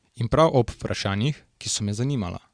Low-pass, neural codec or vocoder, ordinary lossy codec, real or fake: 9.9 kHz; none; Opus, 64 kbps; real